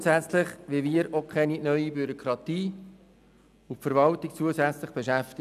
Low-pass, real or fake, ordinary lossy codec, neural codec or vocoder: 14.4 kHz; real; none; none